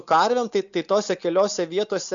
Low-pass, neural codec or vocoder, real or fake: 7.2 kHz; none; real